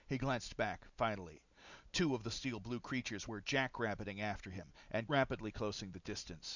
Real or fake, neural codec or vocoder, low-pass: real; none; 7.2 kHz